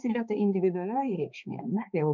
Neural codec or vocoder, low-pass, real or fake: codec, 16 kHz, 2 kbps, X-Codec, HuBERT features, trained on balanced general audio; 7.2 kHz; fake